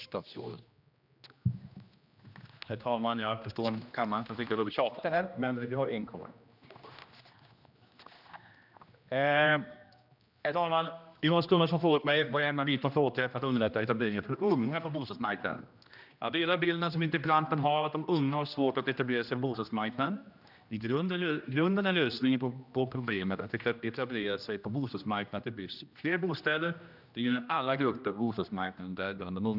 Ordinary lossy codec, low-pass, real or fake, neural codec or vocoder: none; 5.4 kHz; fake; codec, 16 kHz, 1 kbps, X-Codec, HuBERT features, trained on general audio